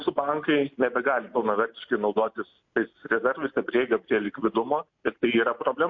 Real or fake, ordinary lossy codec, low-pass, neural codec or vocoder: real; MP3, 48 kbps; 7.2 kHz; none